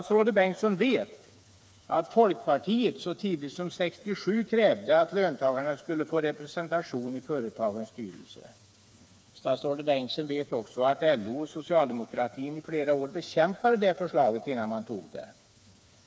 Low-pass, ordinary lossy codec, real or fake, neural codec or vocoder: none; none; fake; codec, 16 kHz, 4 kbps, FreqCodec, smaller model